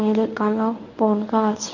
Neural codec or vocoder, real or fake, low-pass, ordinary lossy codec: codec, 24 kHz, 0.9 kbps, WavTokenizer, medium speech release version 1; fake; 7.2 kHz; none